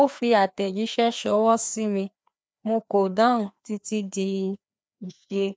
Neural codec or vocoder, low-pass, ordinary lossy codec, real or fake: codec, 16 kHz, 2 kbps, FreqCodec, larger model; none; none; fake